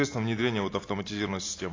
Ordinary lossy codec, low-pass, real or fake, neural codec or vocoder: AAC, 32 kbps; 7.2 kHz; real; none